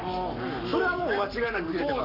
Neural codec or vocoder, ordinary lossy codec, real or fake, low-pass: codec, 44.1 kHz, 7.8 kbps, Pupu-Codec; none; fake; 5.4 kHz